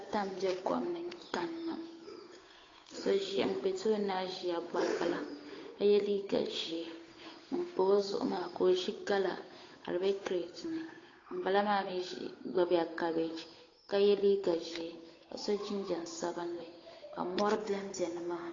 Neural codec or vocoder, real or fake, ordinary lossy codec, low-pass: codec, 16 kHz, 8 kbps, FunCodec, trained on Chinese and English, 25 frames a second; fake; AAC, 32 kbps; 7.2 kHz